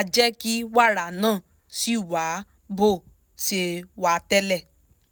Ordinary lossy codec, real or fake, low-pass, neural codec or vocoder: none; real; none; none